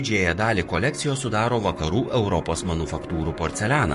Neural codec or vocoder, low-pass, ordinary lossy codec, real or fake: codec, 44.1 kHz, 7.8 kbps, Pupu-Codec; 14.4 kHz; MP3, 48 kbps; fake